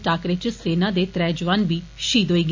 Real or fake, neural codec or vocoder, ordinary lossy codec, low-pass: real; none; MP3, 48 kbps; 7.2 kHz